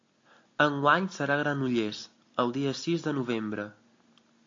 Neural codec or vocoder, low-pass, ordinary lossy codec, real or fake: none; 7.2 kHz; AAC, 48 kbps; real